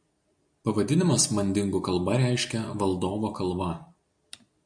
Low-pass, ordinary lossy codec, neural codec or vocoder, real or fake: 9.9 kHz; MP3, 64 kbps; none; real